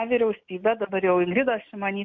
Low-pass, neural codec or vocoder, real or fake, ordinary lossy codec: 7.2 kHz; none; real; MP3, 48 kbps